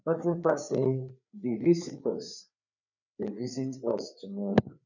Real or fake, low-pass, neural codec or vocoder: fake; 7.2 kHz; codec, 16 kHz, 4 kbps, FreqCodec, larger model